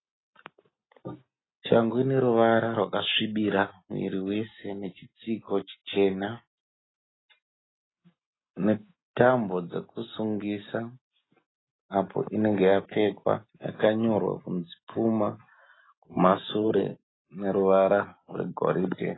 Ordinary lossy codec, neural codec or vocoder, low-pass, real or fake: AAC, 16 kbps; none; 7.2 kHz; real